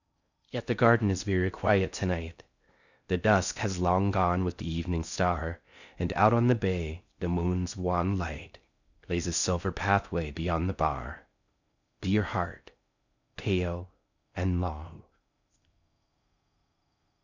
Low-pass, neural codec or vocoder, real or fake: 7.2 kHz; codec, 16 kHz in and 24 kHz out, 0.6 kbps, FocalCodec, streaming, 4096 codes; fake